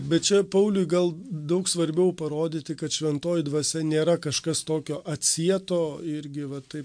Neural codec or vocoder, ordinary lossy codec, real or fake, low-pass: none; MP3, 64 kbps; real; 9.9 kHz